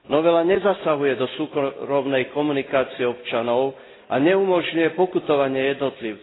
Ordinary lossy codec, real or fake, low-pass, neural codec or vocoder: AAC, 16 kbps; real; 7.2 kHz; none